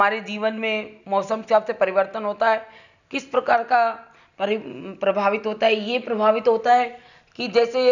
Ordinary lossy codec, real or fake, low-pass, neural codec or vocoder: none; real; 7.2 kHz; none